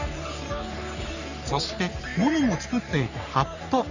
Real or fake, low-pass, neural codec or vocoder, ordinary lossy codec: fake; 7.2 kHz; codec, 44.1 kHz, 3.4 kbps, Pupu-Codec; none